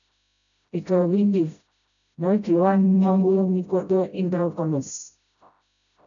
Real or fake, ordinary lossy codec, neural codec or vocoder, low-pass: fake; MP3, 96 kbps; codec, 16 kHz, 0.5 kbps, FreqCodec, smaller model; 7.2 kHz